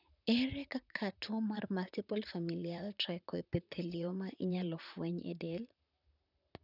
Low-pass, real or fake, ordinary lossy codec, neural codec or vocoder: 5.4 kHz; fake; none; vocoder, 44.1 kHz, 128 mel bands, Pupu-Vocoder